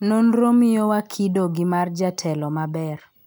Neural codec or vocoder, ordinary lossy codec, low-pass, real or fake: none; none; none; real